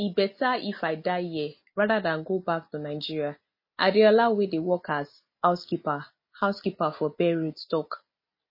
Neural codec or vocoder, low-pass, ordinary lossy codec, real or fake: none; 5.4 kHz; MP3, 24 kbps; real